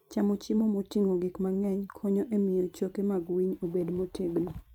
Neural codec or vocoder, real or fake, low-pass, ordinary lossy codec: vocoder, 44.1 kHz, 128 mel bands every 512 samples, BigVGAN v2; fake; 19.8 kHz; none